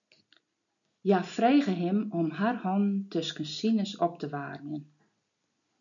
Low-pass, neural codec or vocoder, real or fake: 7.2 kHz; none; real